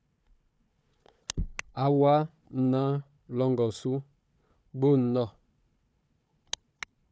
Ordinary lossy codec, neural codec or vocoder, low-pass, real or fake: none; codec, 16 kHz, 4 kbps, FunCodec, trained on Chinese and English, 50 frames a second; none; fake